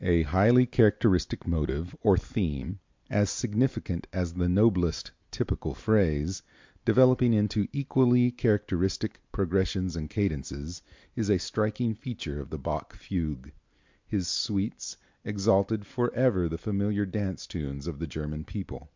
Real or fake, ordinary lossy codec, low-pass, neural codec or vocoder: real; MP3, 64 kbps; 7.2 kHz; none